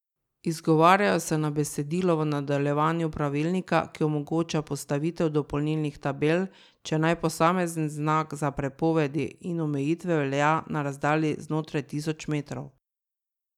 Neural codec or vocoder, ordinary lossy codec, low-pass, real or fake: none; none; 19.8 kHz; real